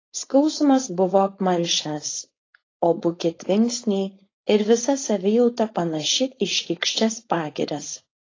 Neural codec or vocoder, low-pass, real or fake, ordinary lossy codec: codec, 16 kHz, 4.8 kbps, FACodec; 7.2 kHz; fake; AAC, 32 kbps